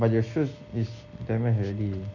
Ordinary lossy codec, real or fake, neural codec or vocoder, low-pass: none; real; none; 7.2 kHz